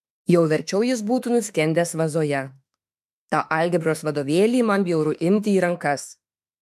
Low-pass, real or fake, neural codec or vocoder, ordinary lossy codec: 14.4 kHz; fake; autoencoder, 48 kHz, 32 numbers a frame, DAC-VAE, trained on Japanese speech; MP3, 96 kbps